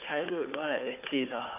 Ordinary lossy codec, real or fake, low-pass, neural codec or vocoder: none; fake; 3.6 kHz; codec, 16 kHz, 4 kbps, FunCodec, trained on Chinese and English, 50 frames a second